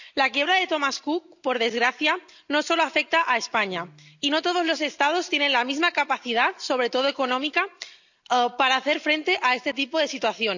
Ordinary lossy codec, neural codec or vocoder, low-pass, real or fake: none; none; 7.2 kHz; real